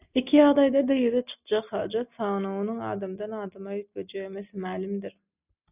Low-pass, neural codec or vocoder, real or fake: 3.6 kHz; none; real